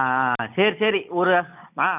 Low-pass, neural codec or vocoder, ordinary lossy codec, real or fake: 3.6 kHz; none; none; real